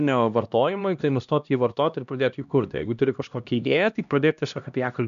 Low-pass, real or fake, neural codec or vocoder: 7.2 kHz; fake; codec, 16 kHz, 1 kbps, X-Codec, HuBERT features, trained on LibriSpeech